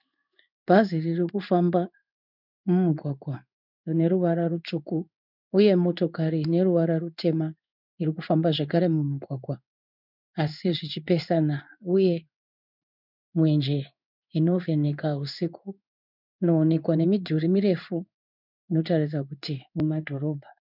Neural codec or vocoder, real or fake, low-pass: codec, 16 kHz in and 24 kHz out, 1 kbps, XY-Tokenizer; fake; 5.4 kHz